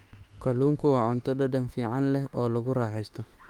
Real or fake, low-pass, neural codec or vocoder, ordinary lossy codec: fake; 19.8 kHz; autoencoder, 48 kHz, 32 numbers a frame, DAC-VAE, trained on Japanese speech; Opus, 32 kbps